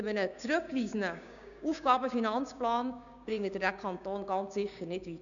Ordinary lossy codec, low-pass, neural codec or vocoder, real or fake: none; 7.2 kHz; codec, 16 kHz, 6 kbps, DAC; fake